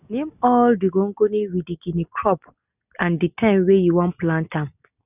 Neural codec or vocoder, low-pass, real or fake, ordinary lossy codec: none; 3.6 kHz; real; none